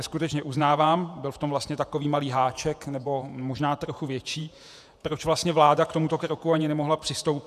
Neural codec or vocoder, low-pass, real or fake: vocoder, 48 kHz, 128 mel bands, Vocos; 14.4 kHz; fake